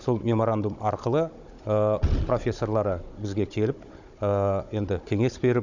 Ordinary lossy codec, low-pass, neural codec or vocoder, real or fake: none; 7.2 kHz; codec, 16 kHz, 16 kbps, FunCodec, trained on Chinese and English, 50 frames a second; fake